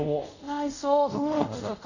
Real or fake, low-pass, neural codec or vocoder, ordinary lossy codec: fake; 7.2 kHz; codec, 24 kHz, 0.5 kbps, DualCodec; none